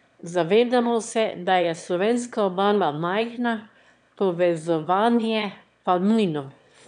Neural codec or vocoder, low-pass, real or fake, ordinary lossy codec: autoencoder, 22.05 kHz, a latent of 192 numbers a frame, VITS, trained on one speaker; 9.9 kHz; fake; none